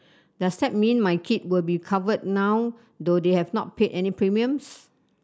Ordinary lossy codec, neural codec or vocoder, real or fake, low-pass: none; none; real; none